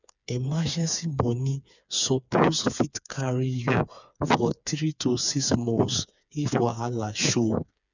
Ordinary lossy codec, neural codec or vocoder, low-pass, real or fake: none; codec, 16 kHz, 4 kbps, FreqCodec, smaller model; 7.2 kHz; fake